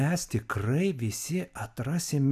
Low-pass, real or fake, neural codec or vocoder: 14.4 kHz; real; none